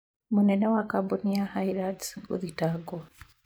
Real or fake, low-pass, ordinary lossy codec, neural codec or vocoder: fake; none; none; vocoder, 44.1 kHz, 128 mel bands every 512 samples, BigVGAN v2